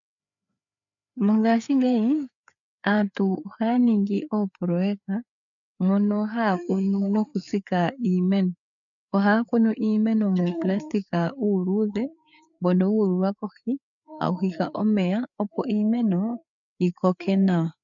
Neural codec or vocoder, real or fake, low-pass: codec, 16 kHz, 4 kbps, FreqCodec, larger model; fake; 7.2 kHz